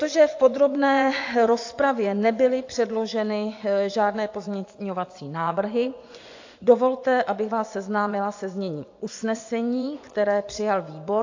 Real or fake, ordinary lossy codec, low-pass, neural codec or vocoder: fake; AAC, 48 kbps; 7.2 kHz; vocoder, 24 kHz, 100 mel bands, Vocos